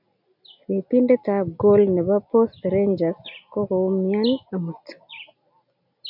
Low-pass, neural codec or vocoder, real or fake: 5.4 kHz; none; real